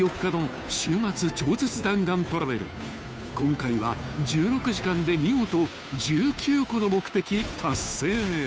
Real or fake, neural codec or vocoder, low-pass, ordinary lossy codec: fake; codec, 16 kHz, 2 kbps, FunCodec, trained on Chinese and English, 25 frames a second; none; none